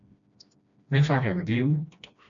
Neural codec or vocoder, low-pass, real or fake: codec, 16 kHz, 1 kbps, FreqCodec, smaller model; 7.2 kHz; fake